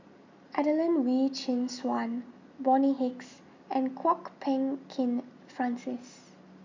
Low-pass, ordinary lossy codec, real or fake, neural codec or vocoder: 7.2 kHz; MP3, 64 kbps; real; none